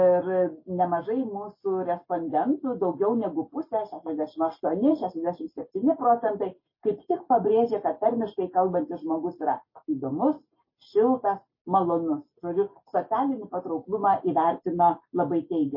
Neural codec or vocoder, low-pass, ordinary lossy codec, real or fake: none; 5.4 kHz; MP3, 24 kbps; real